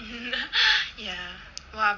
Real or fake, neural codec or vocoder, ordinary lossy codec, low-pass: real; none; Opus, 64 kbps; 7.2 kHz